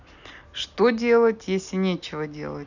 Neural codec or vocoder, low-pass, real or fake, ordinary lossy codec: none; 7.2 kHz; real; none